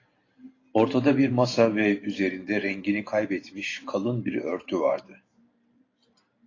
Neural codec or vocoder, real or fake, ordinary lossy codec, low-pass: vocoder, 44.1 kHz, 128 mel bands every 512 samples, BigVGAN v2; fake; AAC, 32 kbps; 7.2 kHz